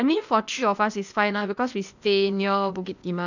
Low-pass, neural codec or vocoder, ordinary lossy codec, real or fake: 7.2 kHz; codec, 16 kHz, 0.8 kbps, ZipCodec; none; fake